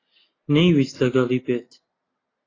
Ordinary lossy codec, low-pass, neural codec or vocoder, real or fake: AAC, 32 kbps; 7.2 kHz; none; real